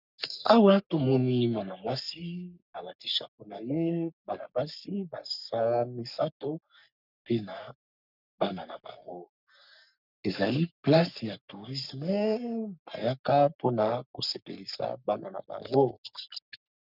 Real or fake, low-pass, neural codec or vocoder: fake; 5.4 kHz; codec, 44.1 kHz, 3.4 kbps, Pupu-Codec